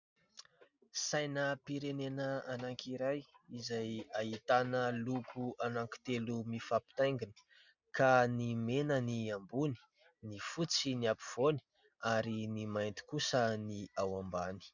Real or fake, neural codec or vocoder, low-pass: real; none; 7.2 kHz